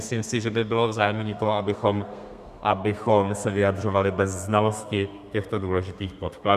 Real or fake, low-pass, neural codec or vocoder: fake; 14.4 kHz; codec, 32 kHz, 1.9 kbps, SNAC